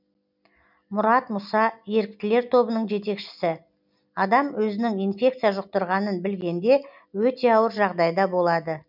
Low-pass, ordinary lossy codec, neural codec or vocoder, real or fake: 5.4 kHz; none; none; real